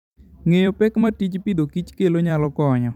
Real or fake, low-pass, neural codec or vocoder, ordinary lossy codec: fake; 19.8 kHz; vocoder, 44.1 kHz, 128 mel bands every 256 samples, BigVGAN v2; none